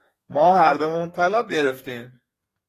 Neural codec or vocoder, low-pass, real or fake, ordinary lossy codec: codec, 32 kHz, 1.9 kbps, SNAC; 14.4 kHz; fake; AAC, 48 kbps